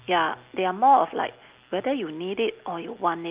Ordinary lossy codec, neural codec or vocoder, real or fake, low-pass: Opus, 32 kbps; none; real; 3.6 kHz